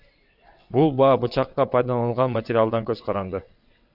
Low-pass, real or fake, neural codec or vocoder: 5.4 kHz; fake; vocoder, 22.05 kHz, 80 mel bands, WaveNeXt